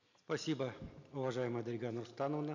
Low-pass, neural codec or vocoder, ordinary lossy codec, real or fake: 7.2 kHz; none; none; real